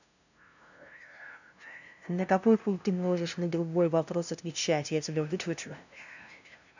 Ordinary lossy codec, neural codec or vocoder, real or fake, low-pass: none; codec, 16 kHz, 0.5 kbps, FunCodec, trained on LibriTTS, 25 frames a second; fake; 7.2 kHz